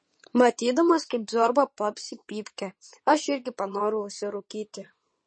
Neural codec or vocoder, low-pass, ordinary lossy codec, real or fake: vocoder, 22.05 kHz, 80 mel bands, WaveNeXt; 9.9 kHz; MP3, 32 kbps; fake